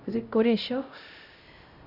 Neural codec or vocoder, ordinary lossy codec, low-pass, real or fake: codec, 16 kHz, 0.5 kbps, X-Codec, HuBERT features, trained on LibriSpeech; none; 5.4 kHz; fake